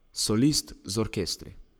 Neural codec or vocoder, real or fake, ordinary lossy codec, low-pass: codec, 44.1 kHz, 7.8 kbps, Pupu-Codec; fake; none; none